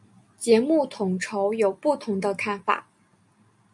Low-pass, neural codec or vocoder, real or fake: 10.8 kHz; none; real